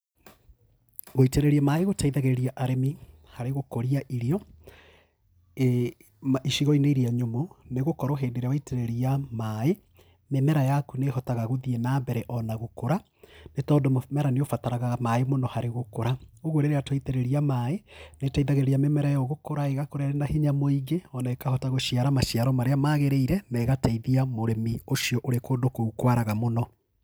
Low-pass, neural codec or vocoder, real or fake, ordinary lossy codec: none; none; real; none